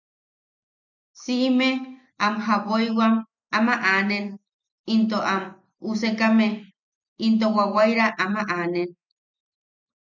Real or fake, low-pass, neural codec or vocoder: real; 7.2 kHz; none